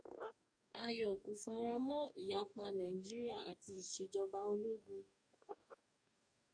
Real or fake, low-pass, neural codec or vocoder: fake; 9.9 kHz; codec, 44.1 kHz, 2.6 kbps, DAC